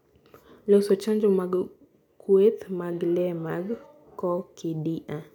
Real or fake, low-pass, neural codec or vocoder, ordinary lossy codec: real; 19.8 kHz; none; none